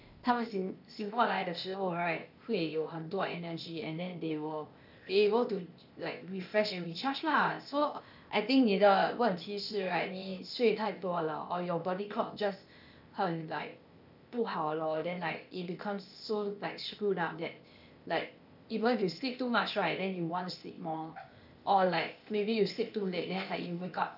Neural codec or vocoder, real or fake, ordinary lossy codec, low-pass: codec, 16 kHz, 0.8 kbps, ZipCodec; fake; none; 5.4 kHz